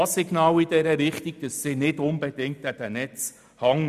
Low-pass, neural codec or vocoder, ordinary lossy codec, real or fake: 14.4 kHz; none; none; real